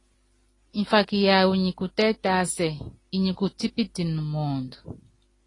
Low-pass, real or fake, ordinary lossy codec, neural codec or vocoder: 10.8 kHz; real; AAC, 32 kbps; none